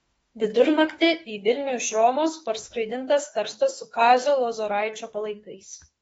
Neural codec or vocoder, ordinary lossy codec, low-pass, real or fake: autoencoder, 48 kHz, 32 numbers a frame, DAC-VAE, trained on Japanese speech; AAC, 24 kbps; 19.8 kHz; fake